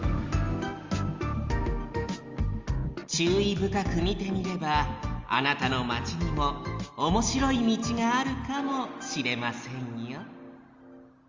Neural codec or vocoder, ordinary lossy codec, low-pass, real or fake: none; Opus, 32 kbps; 7.2 kHz; real